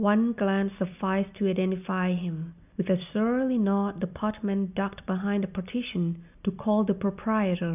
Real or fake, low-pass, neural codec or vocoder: real; 3.6 kHz; none